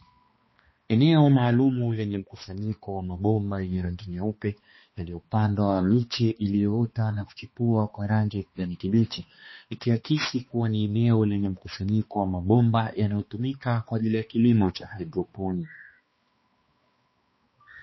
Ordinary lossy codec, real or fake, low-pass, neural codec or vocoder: MP3, 24 kbps; fake; 7.2 kHz; codec, 16 kHz, 2 kbps, X-Codec, HuBERT features, trained on balanced general audio